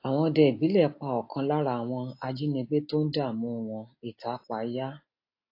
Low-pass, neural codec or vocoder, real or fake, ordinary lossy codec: 5.4 kHz; none; real; AAC, 32 kbps